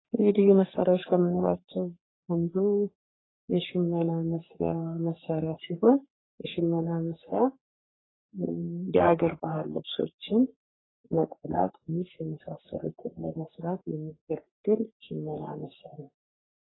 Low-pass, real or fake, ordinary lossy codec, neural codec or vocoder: 7.2 kHz; fake; AAC, 16 kbps; codec, 44.1 kHz, 3.4 kbps, Pupu-Codec